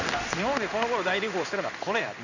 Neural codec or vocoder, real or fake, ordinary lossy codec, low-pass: codec, 16 kHz in and 24 kHz out, 1 kbps, XY-Tokenizer; fake; none; 7.2 kHz